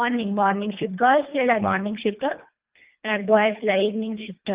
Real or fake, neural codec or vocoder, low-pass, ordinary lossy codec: fake; codec, 24 kHz, 1.5 kbps, HILCodec; 3.6 kHz; Opus, 32 kbps